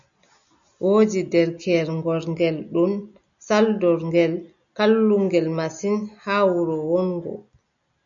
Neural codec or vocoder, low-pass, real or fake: none; 7.2 kHz; real